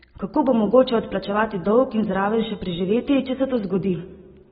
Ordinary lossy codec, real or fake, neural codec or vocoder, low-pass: AAC, 16 kbps; real; none; 19.8 kHz